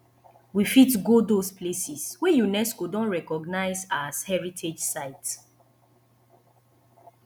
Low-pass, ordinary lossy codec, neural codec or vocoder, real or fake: none; none; none; real